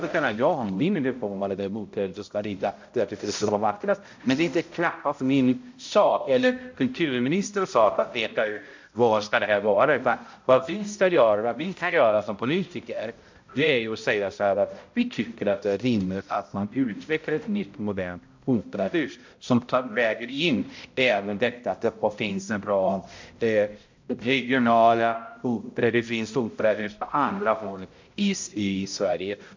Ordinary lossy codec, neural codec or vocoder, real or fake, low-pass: MP3, 48 kbps; codec, 16 kHz, 0.5 kbps, X-Codec, HuBERT features, trained on balanced general audio; fake; 7.2 kHz